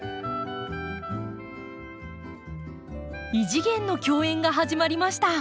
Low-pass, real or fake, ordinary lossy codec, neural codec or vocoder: none; real; none; none